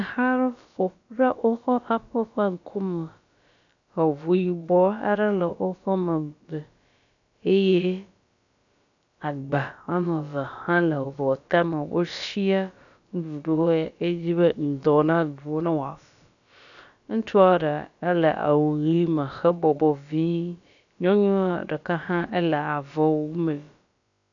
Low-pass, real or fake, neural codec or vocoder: 7.2 kHz; fake; codec, 16 kHz, about 1 kbps, DyCAST, with the encoder's durations